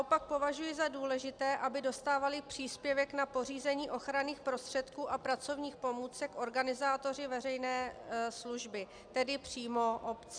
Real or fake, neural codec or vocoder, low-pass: real; none; 9.9 kHz